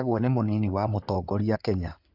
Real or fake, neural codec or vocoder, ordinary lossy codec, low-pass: fake; codec, 16 kHz in and 24 kHz out, 2.2 kbps, FireRedTTS-2 codec; AAC, 48 kbps; 5.4 kHz